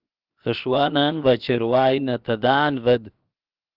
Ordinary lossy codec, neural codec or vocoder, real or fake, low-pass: Opus, 32 kbps; codec, 16 kHz, 0.7 kbps, FocalCodec; fake; 5.4 kHz